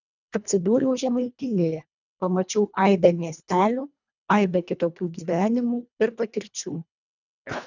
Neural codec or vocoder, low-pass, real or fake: codec, 24 kHz, 1.5 kbps, HILCodec; 7.2 kHz; fake